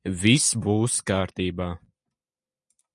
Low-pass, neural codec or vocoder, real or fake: 10.8 kHz; none; real